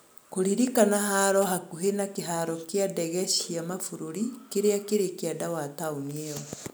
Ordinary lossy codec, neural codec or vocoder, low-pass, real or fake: none; none; none; real